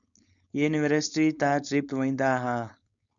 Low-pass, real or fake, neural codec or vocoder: 7.2 kHz; fake; codec, 16 kHz, 4.8 kbps, FACodec